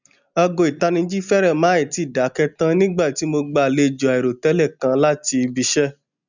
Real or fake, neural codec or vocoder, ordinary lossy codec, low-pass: real; none; none; 7.2 kHz